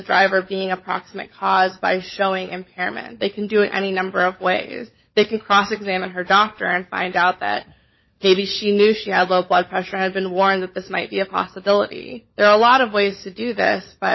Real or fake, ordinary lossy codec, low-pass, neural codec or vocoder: fake; MP3, 24 kbps; 7.2 kHz; codec, 16 kHz, 16 kbps, FunCodec, trained on LibriTTS, 50 frames a second